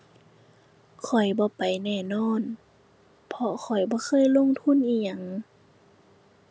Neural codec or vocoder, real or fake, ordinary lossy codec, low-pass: none; real; none; none